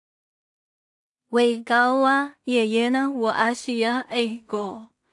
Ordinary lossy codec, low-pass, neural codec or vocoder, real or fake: AAC, 64 kbps; 10.8 kHz; codec, 16 kHz in and 24 kHz out, 0.4 kbps, LongCat-Audio-Codec, two codebook decoder; fake